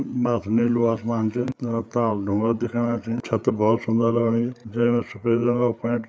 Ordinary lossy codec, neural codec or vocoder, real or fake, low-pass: none; codec, 16 kHz, 8 kbps, FreqCodec, larger model; fake; none